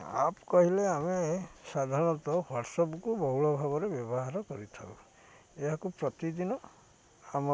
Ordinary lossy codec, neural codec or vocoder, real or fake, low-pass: none; none; real; none